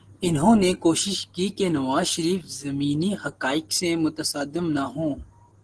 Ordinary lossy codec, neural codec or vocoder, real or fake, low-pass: Opus, 16 kbps; none; real; 9.9 kHz